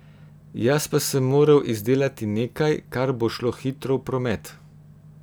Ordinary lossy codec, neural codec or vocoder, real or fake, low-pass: none; none; real; none